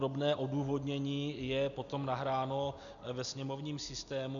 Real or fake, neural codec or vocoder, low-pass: real; none; 7.2 kHz